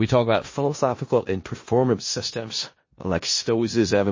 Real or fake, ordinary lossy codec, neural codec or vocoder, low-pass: fake; MP3, 32 kbps; codec, 16 kHz in and 24 kHz out, 0.4 kbps, LongCat-Audio-Codec, four codebook decoder; 7.2 kHz